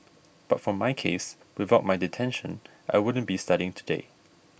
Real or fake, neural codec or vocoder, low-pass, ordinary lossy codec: real; none; none; none